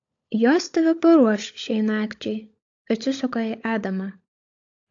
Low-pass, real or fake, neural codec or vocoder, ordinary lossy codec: 7.2 kHz; fake; codec, 16 kHz, 16 kbps, FunCodec, trained on LibriTTS, 50 frames a second; AAC, 48 kbps